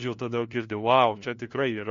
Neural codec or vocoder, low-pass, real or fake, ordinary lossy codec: codec, 16 kHz, 1 kbps, FunCodec, trained on LibriTTS, 50 frames a second; 7.2 kHz; fake; MP3, 48 kbps